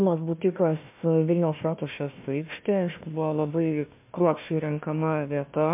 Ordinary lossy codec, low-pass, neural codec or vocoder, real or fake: MP3, 32 kbps; 3.6 kHz; codec, 16 kHz, 1 kbps, FunCodec, trained on Chinese and English, 50 frames a second; fake